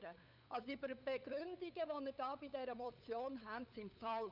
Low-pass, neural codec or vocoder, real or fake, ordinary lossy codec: 5.4 kHz; codec, 16 kHz, 8 kbps, FunCodec, trained on LibriTTS, 25 frames a second; fake; none